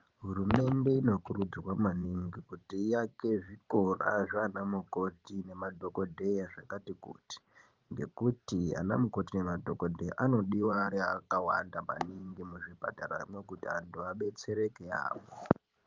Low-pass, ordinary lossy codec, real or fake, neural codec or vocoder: 7.2 kHz; Opus, 32 kbps; fake; vocoder, 44.1 kHz, 128 mel bands every 512 samples, BigVGAN v2